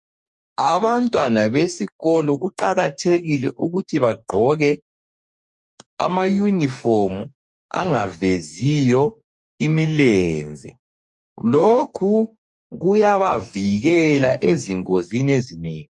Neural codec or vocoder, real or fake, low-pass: codec, 44.1 kHz, 2.6 kbps, DAC; fake; 10.8 kHz